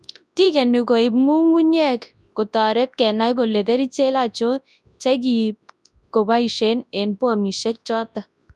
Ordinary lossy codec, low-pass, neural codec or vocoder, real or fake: none; none; codec, 24 kHz, 0.9 kbps, WavTokenizer, large speech release; fake